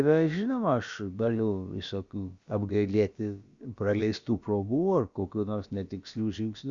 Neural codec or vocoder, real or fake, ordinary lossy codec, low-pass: codec, 16 kHz, about 1 kbps, DyCAST, with the encoder's durations; fake; Opus, 64 kbps; 7.2 kHz